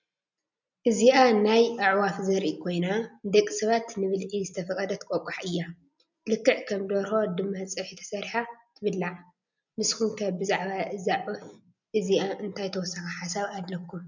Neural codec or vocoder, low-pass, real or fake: none; 7.2 kHz; real